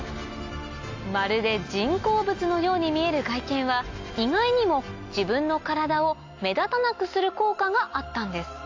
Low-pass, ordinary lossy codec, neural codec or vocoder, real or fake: 7.2 kHz; AAC, 48 kbps; none; real